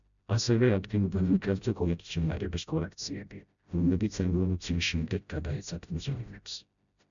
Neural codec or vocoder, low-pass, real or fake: codec, 16 kHz, 0.5 kbps, FreqCodec, smaller model; 7.2 kHz; fake